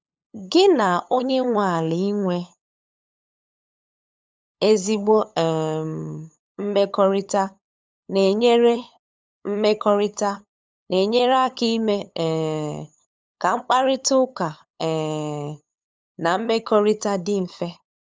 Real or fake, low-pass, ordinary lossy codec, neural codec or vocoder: fake; none; none; codec, 16 kHz, 8 kbps, FunCodec, trained on LibriTTS, 25 frames a second